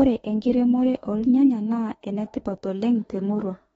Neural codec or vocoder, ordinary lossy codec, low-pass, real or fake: autoencoder, 48 kHz, 32 numbers a frame, DAC-VAE, trained on Japanese speech; AAC, 24 kbps; 19.8 kHz; fake